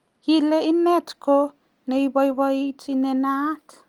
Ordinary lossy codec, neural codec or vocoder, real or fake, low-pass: Opus, 32 kbps; none; real; 19.8 kHz